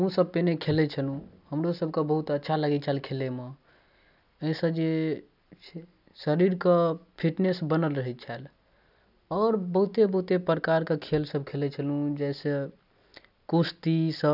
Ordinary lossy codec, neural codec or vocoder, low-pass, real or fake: none; none; 5.4 kHz; real